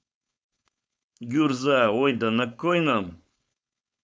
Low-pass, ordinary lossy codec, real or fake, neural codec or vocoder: none; none; fake; codec, 16 kHz, 4.8 kbps, FACodec